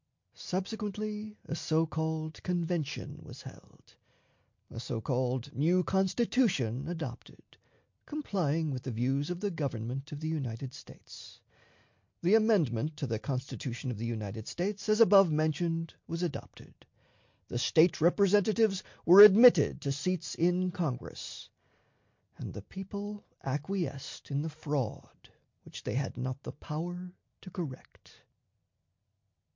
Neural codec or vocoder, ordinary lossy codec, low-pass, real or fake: none; MP3, 48 kbps; 7.2 kHz; real